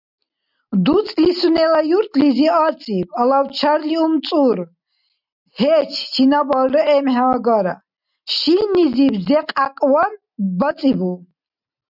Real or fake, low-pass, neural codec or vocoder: real; 5.4 kHz; none